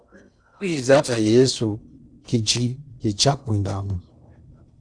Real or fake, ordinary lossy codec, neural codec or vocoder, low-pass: fake; Opus, 64 kbps; codec, 16 kHz in and 24 kHz out, 0.6 kbps, FocalCodec, streaming, 4096 codes; 9.9 kHz